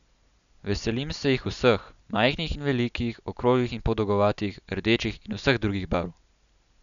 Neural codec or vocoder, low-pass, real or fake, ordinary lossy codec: none; 7.2 kHz; real; none